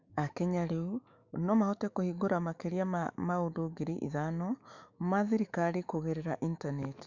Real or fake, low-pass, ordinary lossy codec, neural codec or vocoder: fake; 7.2 kHz; Opus, 64 kbps; autoencoder, 48 kHz, 128 numbers a frame, DAC-VAE, trained on Japanese speech